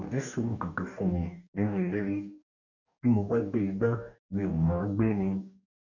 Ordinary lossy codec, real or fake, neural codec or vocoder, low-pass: none; fake; codec, 44.1 kHz, 2.6 kbps, DAC; 7.2 kHz